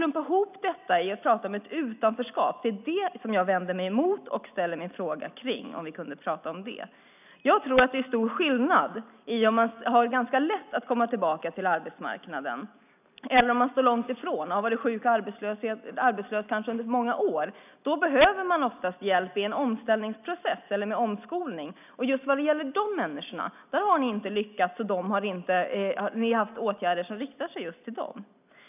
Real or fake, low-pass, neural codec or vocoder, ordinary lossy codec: real; 3.6 kHz; none; none